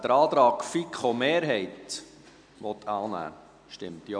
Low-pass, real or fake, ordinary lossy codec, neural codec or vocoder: 9.9 kHz; real; none; none